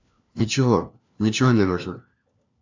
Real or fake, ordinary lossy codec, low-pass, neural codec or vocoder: fake; AAC, 48 kbps; 7.2 kHz; codec, 16 kHz, 1 kbps, FunCodec, trained on LibriTTS, 50 frames a second